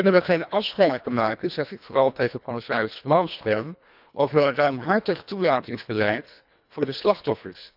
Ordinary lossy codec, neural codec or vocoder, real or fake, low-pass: none; codec, 24 kHz, 1.5 kbps, HILCodec; fake; 5.4 kHz